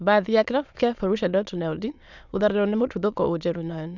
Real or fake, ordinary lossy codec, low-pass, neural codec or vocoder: fake; none; 7.2 kHz; autoencoder, 22.05 kHz, a latent of 192 numbers a frame, VITS, trained on many speakers